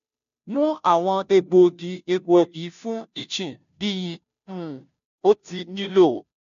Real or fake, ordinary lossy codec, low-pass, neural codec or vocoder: fake; AAC, 96 kbps; 7.2 kHz; codec, 16 kHz, 0.5 kbps, FunCodec, trained on Chinese and English, 25 frames a second